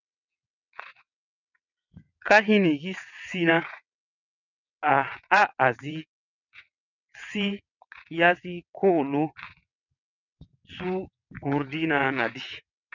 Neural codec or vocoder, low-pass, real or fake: vocoder, 22.05 kHz, 80 mel bands, WaveNeXt; 7.2 kHz; fake